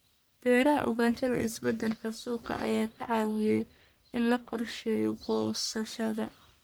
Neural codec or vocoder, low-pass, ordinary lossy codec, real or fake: codec, 44.1 kHz, 1.7 kbps, Pupu-Codec; none; none; fake